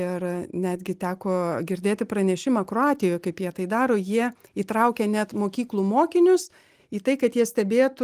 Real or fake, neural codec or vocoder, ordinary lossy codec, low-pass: real; none; Opus, 24 kbps; 14.4 kHz